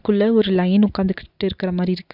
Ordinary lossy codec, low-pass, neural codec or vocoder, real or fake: Opus, 64 kbps; 5.4 kHz; none; real